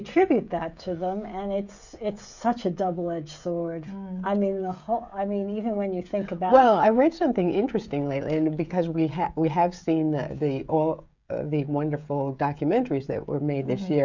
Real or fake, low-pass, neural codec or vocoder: fake; 7.2 kHz; codec, 16 kHz, 16 kbps, FreqCodec, smaller model